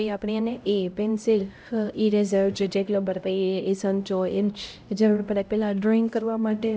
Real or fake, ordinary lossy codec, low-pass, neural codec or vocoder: fake; none; none; codec, 16 kHz, 0.5 kbps, X-Codec, HuBERT features, trained on LibriSpeech